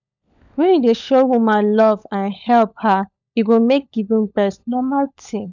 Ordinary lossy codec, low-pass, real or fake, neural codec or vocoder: none; 7.2 kHz; fake; codec, 16 kHz, 16 kbps, FunCodec, trained on LibriTTS, 50 frames a second